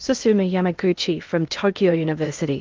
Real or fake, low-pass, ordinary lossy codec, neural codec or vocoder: fake; 7.2 kHz; Opus, 32 kbps; codec, 16 kHz in and 24 kHz out, 0.8 kbps, FocalCodec, streaming, 65536 codes